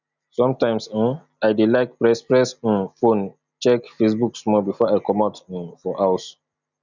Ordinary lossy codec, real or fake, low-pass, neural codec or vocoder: none; real; 7.2 kHz; none